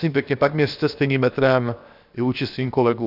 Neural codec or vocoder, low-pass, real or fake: codec, 16 kHz, 0.7 kbps, FocalCodec; 5.4 kHz; fake